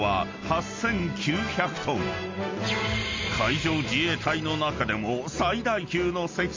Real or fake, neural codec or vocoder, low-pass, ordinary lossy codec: real; none; 7.2 kHz; MP3, 48 kbps